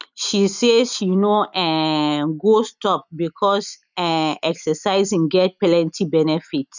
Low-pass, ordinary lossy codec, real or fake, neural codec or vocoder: 7.2 kHz; none; real; none